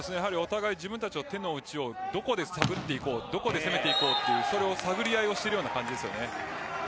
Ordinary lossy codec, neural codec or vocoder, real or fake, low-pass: none; none; real; none